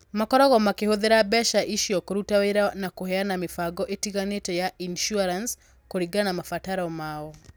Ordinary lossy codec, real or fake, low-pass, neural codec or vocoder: none; real; none; none